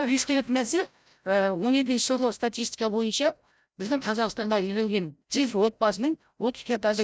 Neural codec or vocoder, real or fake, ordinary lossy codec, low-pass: codec, 16 kHz, 0.5 kbps, FreqCodec, larger model; fake; none; none